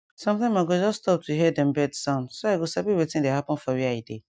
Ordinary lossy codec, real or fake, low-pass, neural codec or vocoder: none; real; none; none